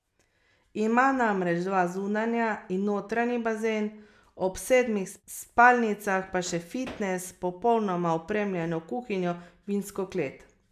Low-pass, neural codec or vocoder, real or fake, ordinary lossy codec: 14.4 kHz; none; real; MP3, 96 kbps